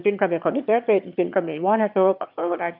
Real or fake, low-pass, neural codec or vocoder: fake; 5.4 kHz; autoencoder, 22.05 kHz, a latent of 192 numbers a frame, VITS, trained on one speaker